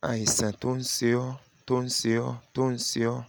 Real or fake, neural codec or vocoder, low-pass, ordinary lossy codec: real; none; none; none